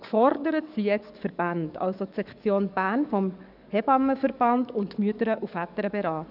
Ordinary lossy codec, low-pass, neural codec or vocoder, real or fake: none; 5.4 kHz; codec, 44.1 kHz, 7.8 kbps, DAC; fake